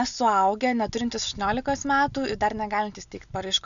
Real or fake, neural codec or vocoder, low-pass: real; none; 7.2 kHz